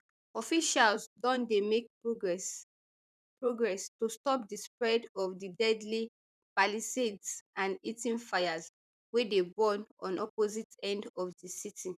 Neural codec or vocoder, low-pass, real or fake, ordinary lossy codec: vocoder, 44.1 kHz, 128 mel bands, Pupu-Vocoder; 14.4 kHz; fake; none